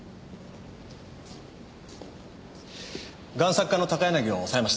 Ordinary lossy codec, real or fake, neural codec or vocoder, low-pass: none; real; none; none